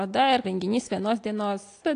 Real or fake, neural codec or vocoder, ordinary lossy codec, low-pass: real; none; AAC, 48 kbps; 9.9 kHz